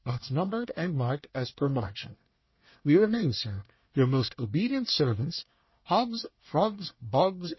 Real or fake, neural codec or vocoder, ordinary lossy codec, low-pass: fake; codec, 24 kHz, 1 kbps, SNAC; MP3, 24 kbps; 7.2 kHz